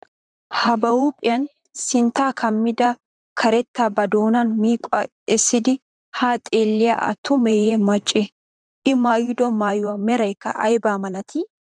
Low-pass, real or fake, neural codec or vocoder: 9.9 kHz; fake; vocoder, 44.1 kHz, 128 mel bands, Pupu-Vocoder